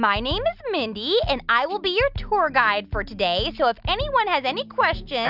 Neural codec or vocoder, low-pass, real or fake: none; 5.4 kHz; real